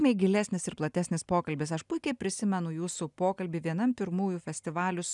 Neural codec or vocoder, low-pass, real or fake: none; 10.8 kHz; real